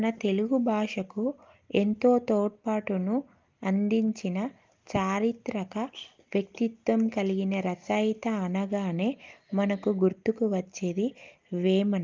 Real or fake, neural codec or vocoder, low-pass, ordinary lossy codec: real; none; 7.2 kHz; Opus, 32 kbps